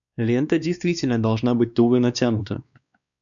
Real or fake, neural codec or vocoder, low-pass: fake; codec, 16 kHz, 2 kbps, X-Codec, WavLM features, trained on Multilingual LibriSpeech; 7.2 kHz